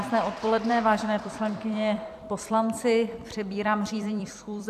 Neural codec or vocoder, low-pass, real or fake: vocoder, 44.1 kHz, 128 mel bands every 256 samples, BigVGAN v2; 14.4 kHz; fake